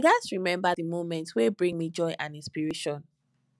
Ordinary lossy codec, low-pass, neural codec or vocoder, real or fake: none; none; none; real